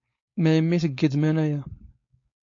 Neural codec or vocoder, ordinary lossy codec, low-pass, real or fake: codec, 16 kHz, 4.8 kbps, FACodec; AAC, 48 kbps; 7.2 kHz; fake